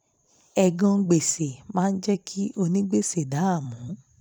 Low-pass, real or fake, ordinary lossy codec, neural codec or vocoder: 19.8 kHz; fake; none; vocoder, 44.1 kHz, 128 mel bands every 256 samples, BigVGAN v2